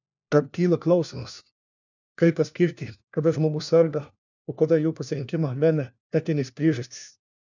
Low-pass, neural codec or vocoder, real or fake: 7.2 kHz; codec, 16 kHz, 1 kbps, FunCodec, trained on LibriTTS, 50 frames a second; fake